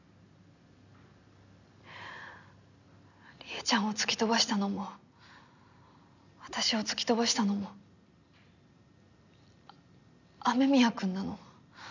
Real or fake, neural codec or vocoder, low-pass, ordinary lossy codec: real; none; 7.2 kHz; none